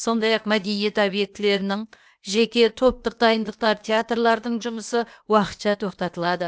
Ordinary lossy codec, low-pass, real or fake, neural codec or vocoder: none; none; fake; codec, 16 kHz, 0.8 kbps, ZipCodec